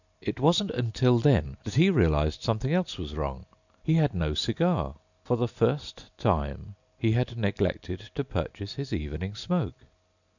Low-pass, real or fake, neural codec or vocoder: 7.2 kHz; real; none